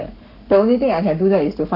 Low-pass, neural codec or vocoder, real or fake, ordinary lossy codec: 5.4 kHz; codec, 44.1 kHz, 7.8 kbps, Pupu-Codec; fake; none